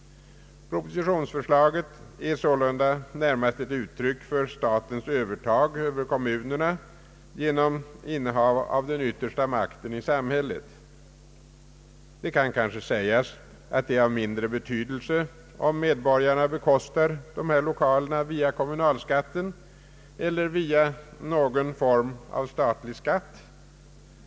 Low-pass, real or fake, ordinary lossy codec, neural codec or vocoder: none; real; none; none